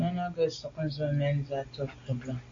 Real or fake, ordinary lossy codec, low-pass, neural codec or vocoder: real; AAC, 32 kbps; 7.2 kHz; none